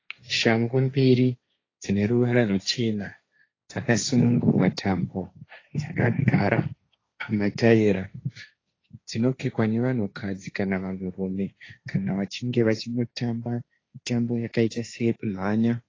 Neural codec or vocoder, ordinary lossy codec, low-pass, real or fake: codec, 16 kHz, 1.1 kbps, Voila-Tokenizer; AAC, 32 kbps; 7.2 kHz; fake